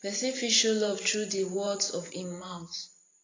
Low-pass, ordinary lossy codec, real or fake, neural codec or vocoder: 7.2 kHz; AAC, 32 kbps; fake; vocoder, 44.1 kHz, 128 mel bands every 512 samples, BigVGAN v2